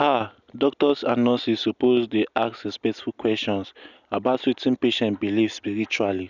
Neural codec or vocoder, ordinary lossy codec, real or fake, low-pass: none; none; real; 7.2 kHz